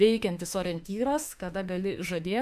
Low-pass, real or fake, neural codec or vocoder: 14.4 kHz; fake; autoencoder, 48 kHz, 32 numbers a frame, DAC-VAE, trained on Japanese speech